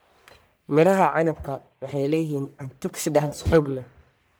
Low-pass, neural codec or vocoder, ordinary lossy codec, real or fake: none; codec, 44.1 kHz, 1.7 kbps, Pupu-Codec; none; fake